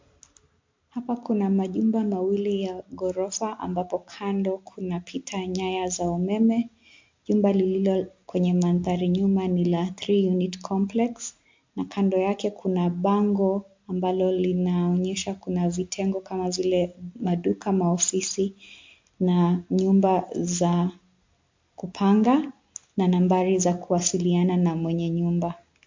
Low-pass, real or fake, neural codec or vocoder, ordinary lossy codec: 7.2 kHz; real; none; MP3, 48 kbps